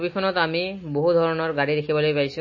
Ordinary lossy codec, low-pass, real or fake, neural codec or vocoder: MP3, 32 kbps; 7.2 kHz; real; none